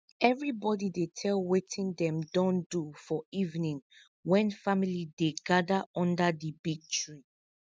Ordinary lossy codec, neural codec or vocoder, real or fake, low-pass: none; none; real; none